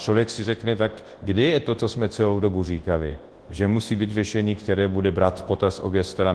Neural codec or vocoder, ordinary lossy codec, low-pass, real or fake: codec, 24 kHz, 0.9 kbps, WavTokenizer, large speech release; Opus, 16 kbps; 10.8 kHz; fake